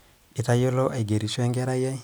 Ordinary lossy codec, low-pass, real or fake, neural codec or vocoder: none; none; real; none